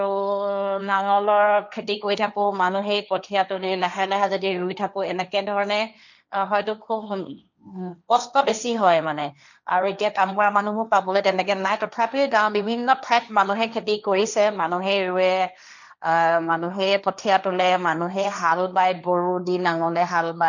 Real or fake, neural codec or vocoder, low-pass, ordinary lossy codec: fake; codec, 16 kHz, 1.1 kbps, Voila-Tokenizer; 7.2 kHz; none